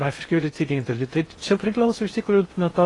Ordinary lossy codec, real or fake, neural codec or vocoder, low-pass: AAC, 32 kbps; fake; codec, 16 kHz in and 24 kHz out, 0.6 kbps, FocalCodec, streaming, 2048 codes; 10.8 kHz